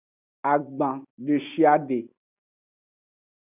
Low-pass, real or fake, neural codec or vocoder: 3.6 kHz; real; none